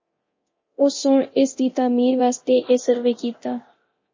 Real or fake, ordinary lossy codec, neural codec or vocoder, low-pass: fake; MP3, 32 kbps; codec, 24 kHz, 0.9 kbps, DualCodec; 7.2 kHz